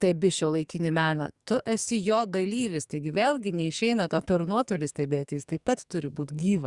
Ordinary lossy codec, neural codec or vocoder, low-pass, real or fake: Opus, 64 kbps; codec, 44.1 kHz, 2.6 kbps, SNAC; 10.8 kHz; fake